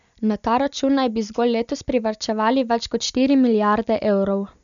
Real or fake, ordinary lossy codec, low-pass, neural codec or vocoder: real; none; 7.2 kHz; none